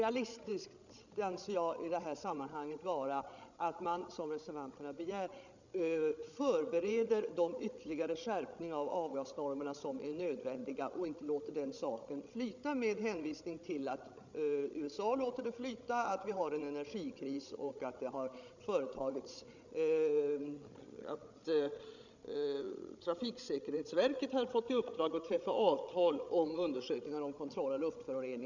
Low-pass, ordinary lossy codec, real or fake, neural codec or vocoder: 7.2 kHz; none; fake; codec, 16 kHz, 16 kbps, FreqCodec, larger model